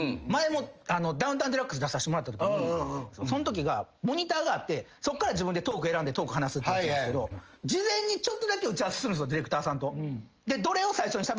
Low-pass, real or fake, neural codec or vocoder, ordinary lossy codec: 7.2 kHz; real; none; Opus, 24 kbps